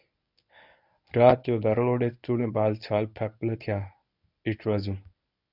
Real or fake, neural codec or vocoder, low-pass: fake; codec, 24 kHz, 0.9 kbps, WavTokenizer, medium speech release version 1; 5.4 kHz